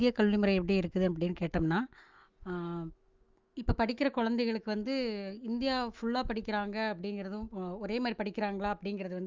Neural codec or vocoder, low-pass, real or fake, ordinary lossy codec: autoencoder, 48 kHz, 128 numbers a frame, DAC-VAE, trained on Japanese speech; 7.2 kHz; fake; Opus, 32 kbps